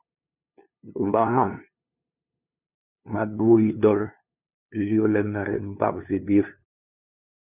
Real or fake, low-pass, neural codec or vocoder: fake; 3.6 kHz; codec, 16 kHz, 2 kbps, FunCodec, trained on LibriTTS, 25 frames a second